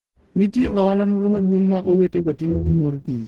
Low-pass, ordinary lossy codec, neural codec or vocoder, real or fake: 19.8 kHz; Opus, 16 kbps; codec, 44.1 kHz, 0.9 kbps, DAC; fake